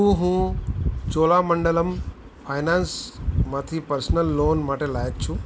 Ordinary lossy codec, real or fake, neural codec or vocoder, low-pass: none; real; none; none